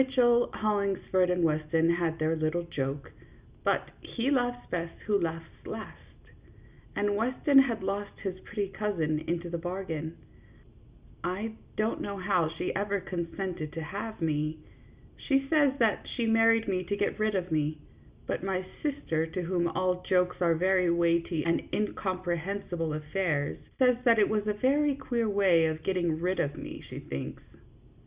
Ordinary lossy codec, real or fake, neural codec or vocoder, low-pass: Opus, 64 kbps; real; none; 3.6 kHz